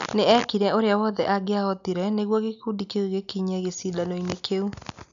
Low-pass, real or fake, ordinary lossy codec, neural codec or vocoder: 7.2 kHz; real; none; none